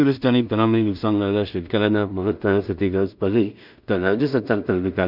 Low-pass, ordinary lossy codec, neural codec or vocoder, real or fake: 5.4 kHz; none; codec, 16 kHz in and 24 kHz out, 0.4 kbps, LongCat-Audio-Codec, two codebook decoder; fake